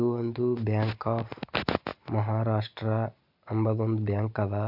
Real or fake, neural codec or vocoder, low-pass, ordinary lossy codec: real; none; 5.4 kHz; MP3, 48 kbps